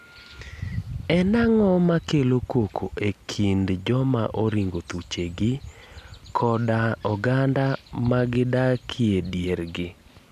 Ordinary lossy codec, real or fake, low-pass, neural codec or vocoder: none; real; 14.4 kHz; none